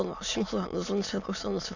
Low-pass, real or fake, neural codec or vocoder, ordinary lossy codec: 7.2 kHz; fake; autoencoder, 22.05 kHz, a latent of 192 numbers a frame, VITS, trained on many speakers; none